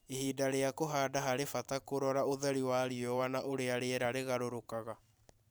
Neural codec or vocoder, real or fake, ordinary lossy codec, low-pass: vocoder, 44.1 kHz, 128 mel bands every 512 samples, BigVGAN v2; fake; none; none